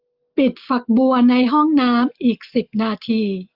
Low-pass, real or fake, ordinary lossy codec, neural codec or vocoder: 5.4 kHz; real; Opus, 24 kbps; none